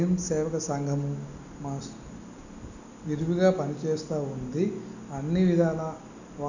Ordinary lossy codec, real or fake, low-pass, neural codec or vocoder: none; real; 7.2 kHz; none